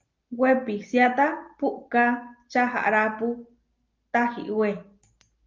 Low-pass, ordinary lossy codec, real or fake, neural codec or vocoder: 7.2 kHz; Opus, 24 kbps; real; none